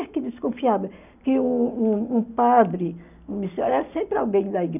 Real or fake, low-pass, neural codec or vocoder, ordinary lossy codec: real; 3.6 kHz; none; none